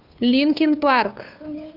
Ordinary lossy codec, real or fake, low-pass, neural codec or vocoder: Opus, 64 kbps; fake; 5.4 kHz; codec, 16 kHz, 2 kbps, FunCodec, trained on Chinese and English, 25 frames a second